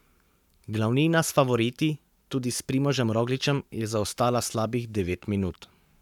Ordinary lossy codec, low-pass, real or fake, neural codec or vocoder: none; 19.8 kHz; fake; codec, 44.1 kHz, 7.8 kbps, Pupu-Codec